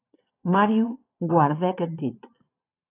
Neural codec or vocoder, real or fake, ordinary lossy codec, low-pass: vocoder, 22.05 kHz, 80 mel bands, Vocos; fake; AAC, 24 kbps; 3.6 kHz